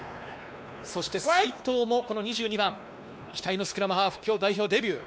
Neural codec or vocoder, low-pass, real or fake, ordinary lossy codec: codec, 16 kHz, 2 kbps, X-Codec, WavLM features, trained on Multilingual LibriSpeech; none; fake; none